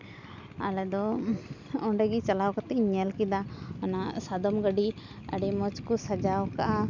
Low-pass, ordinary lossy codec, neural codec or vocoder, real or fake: 7.2 kHz; none; none; real